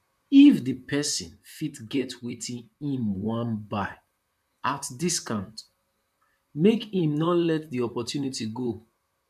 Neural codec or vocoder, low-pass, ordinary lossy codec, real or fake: vocoder, 44.1 kHz, 128 mel bands, Pupu-Vocoder; 14.4 kHz; none; fake